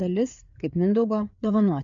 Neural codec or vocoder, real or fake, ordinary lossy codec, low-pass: codec, 16 kHz, 4 kbps, FreqCodec, larger model; fake; MP3, 96 kbps; 7.2 kHz